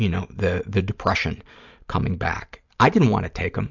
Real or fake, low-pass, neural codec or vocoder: real; 7.2 kHz; none